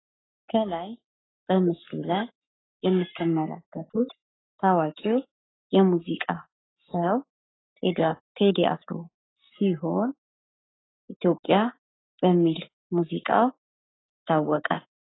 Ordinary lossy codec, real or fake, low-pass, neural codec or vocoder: AAC, 16 kbps; fake; 7.2 kHz; codec, 44.1 kHz, 7.8 kbps, Pupu-Codec